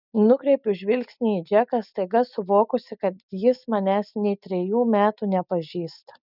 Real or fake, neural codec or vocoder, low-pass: real; none; 5.4 kHz